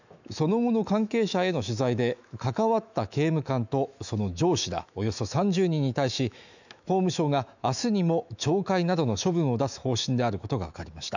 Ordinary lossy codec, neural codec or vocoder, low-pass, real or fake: none; autoencoder, 48 kHz, 128 numbers a frame, DAC-VAE, trained on Japanese speech; 7.2 kHz; fake